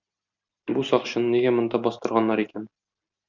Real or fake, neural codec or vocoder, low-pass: real; none; 7.2 kHz